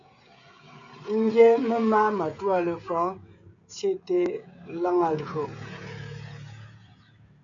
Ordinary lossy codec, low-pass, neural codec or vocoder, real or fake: AAC, 64 kbps; 7.2 kHz; codec, 16 kHz, 16 kbps, FreqCodec, smaller model; fake